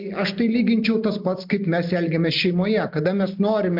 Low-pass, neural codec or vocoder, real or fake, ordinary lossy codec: 5.4 kHz; none; real; MP3, 48 kbps